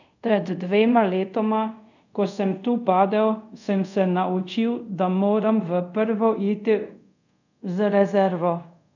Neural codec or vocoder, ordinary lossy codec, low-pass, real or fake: codec, 24 kHz, 0.5 kbps, DualCodec; none; 7.2 kHz; fake